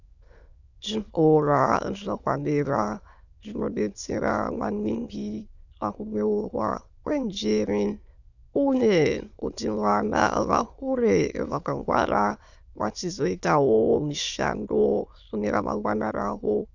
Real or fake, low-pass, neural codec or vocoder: fake; 7.2 kHz; autoencoder, 22.05 kHz, a latent of 192 numbers a frame, VITS, trained on many speakers